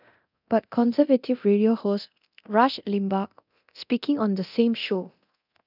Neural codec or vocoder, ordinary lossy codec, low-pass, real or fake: codec, 24 kHz, 0.9 kbps, DualCodec; none; 5.4 kHz; fake